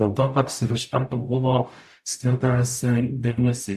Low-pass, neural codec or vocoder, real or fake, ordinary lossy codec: 14.4 kHz; codec, 44.1 kHz, 0.9 kbps, DAC; fake; AAC, 96 kbps